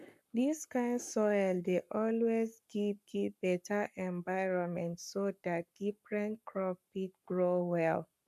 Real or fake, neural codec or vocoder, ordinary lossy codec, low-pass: fake; codec, 44.1 kHz, 7.8 kbps, Pupu-Codec; none; 14.4 kHz